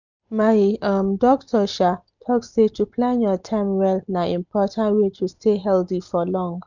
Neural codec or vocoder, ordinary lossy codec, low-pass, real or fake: none; none; 7.2 kHz; real